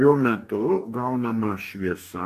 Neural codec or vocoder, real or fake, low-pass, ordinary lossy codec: codec, 44.1 kHz, 2.6 kbps, DAC; fake; 14.4 kHz; AAC, 64 kbps